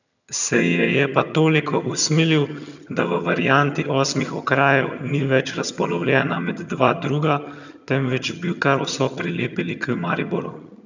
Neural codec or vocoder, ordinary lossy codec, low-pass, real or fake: vocoder, 22.05 kHz, 80 mel bands, HiFi-GAN; none; 7.2 kHz; fake